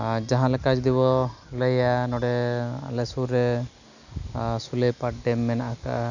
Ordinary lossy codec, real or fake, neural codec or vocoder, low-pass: none; real; none; 7.2 kHz